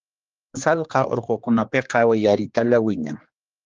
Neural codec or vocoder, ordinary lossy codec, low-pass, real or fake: codec, 16 kHz, 2 kbps, X-Codec, HuBERT features, trained on general audio; Opus, 32 kbps; 7.2 kHz; fake